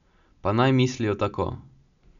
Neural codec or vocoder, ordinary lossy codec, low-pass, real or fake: none; none; 7.2 kHz; real